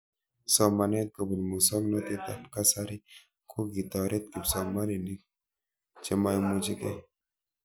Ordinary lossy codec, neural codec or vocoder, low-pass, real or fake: none; none; none; real